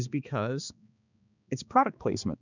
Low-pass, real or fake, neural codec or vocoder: 7.2 kHz; fake; codec, 16 kHz, 2 kbps, X-Codec, HuBERT features, trained on balanced general audio